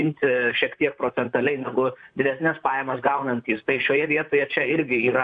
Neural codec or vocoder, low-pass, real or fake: vocoder, 44.1 kHz, 128 mel bands, Pupu-Vocoder; 9.9 kHz; fake